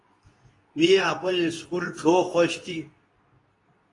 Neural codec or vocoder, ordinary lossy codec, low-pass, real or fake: codec, 24 kHz, 0.9 kbps, WavTokenizer, medium speech release version 2; AAC, 32 kbps; 10.8 kHz; fake